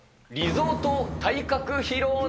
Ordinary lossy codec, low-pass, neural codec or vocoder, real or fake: none; none; none; real